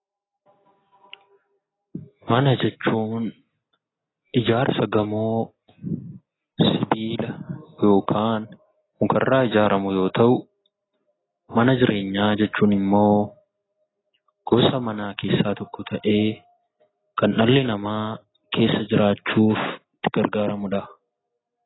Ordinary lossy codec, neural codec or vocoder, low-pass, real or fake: AAC, 16 kbps; none; 7.2 kHz; real